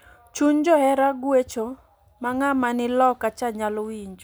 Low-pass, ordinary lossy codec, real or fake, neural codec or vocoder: none; none; real; none